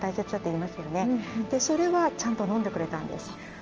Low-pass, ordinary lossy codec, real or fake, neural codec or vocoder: 7.2 kHz; Opus, 24 kbps; real; none